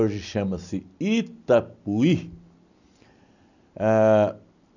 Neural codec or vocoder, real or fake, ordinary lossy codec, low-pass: none; real; none; 7.2 kHz